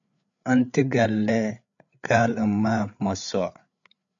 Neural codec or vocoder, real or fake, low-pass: codec, 16 kHz, 8 kbps, FreqCodec, larger model; fake; 7.2 kHz